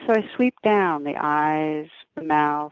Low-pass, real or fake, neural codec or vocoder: 7.2 kHz; real; none